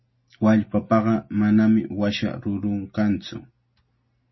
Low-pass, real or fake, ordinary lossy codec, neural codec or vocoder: 7.2 kHz; real; MP3, 24 kbps; none